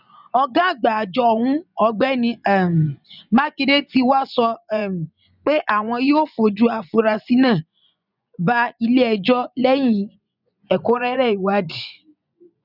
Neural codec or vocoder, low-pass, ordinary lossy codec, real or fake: vocoder, 24 kHz, 100 mel bands, Vocos; 5.4 kHz; none; fake